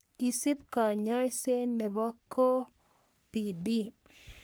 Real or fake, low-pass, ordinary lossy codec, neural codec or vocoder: fake; none; none; codec, 44.1 kHz, 3.4 kbps, Pupu-Codec